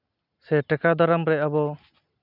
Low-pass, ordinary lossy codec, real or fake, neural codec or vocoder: 5.4 kHz; none; real; none